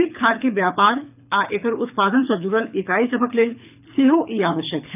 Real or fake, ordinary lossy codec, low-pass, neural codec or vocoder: fake; none; 3.6 kHz; codec, 24 kHz, 6 kbps, HILCodec